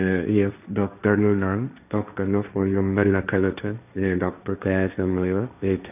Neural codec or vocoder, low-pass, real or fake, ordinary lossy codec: codec, 16 kHz, 1.1 kbps, Voila-Tokenizer; 3.6 kHz; fake; none